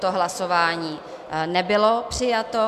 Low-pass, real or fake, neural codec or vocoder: 14.4 kHz; real; none